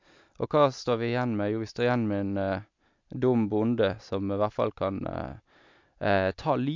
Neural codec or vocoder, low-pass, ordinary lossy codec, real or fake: none; 7.2 kHz; MP3, 64 kbps; real